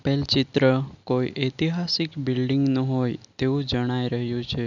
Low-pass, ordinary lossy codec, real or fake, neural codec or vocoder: 7.2 kHz; none; real; none